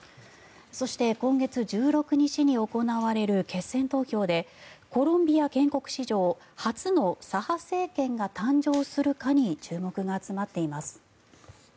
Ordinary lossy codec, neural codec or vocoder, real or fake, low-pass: none; none; real; none